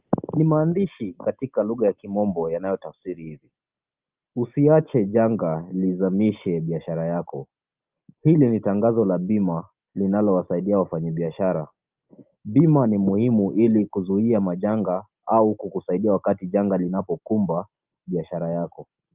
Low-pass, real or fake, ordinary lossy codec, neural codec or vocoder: 3.6 kHz; real; Opus, 24 kbps; none